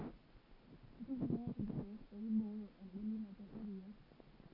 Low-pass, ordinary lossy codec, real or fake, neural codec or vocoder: 5.4 kHz; none; real; none